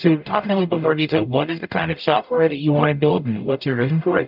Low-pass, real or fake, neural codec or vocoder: 5.4 kHz; fake; codec, 44.1 kHz, 0.9 kbps, DAC